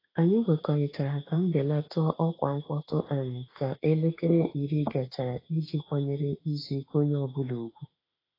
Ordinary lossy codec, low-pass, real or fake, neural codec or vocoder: AAC, 24 kbps; 5.4 kHz; fake; autoencoder, 48 kHz, 32 numbers a frame, DAC-VAE, trained on Japanese speech